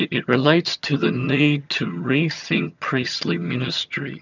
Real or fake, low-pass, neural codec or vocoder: fake; 7.2 kHz; vocoder, 22.05 kHz, 80 mel bands, HiFi-GAN